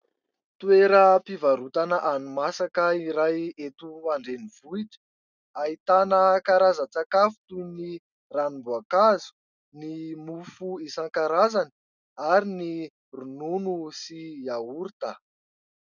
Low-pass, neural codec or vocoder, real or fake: 7.2 kHz; none; real